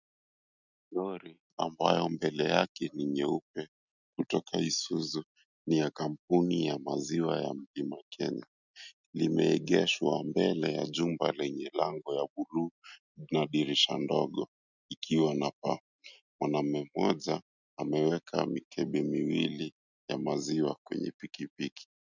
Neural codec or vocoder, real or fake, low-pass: none; real; 7.2 kHz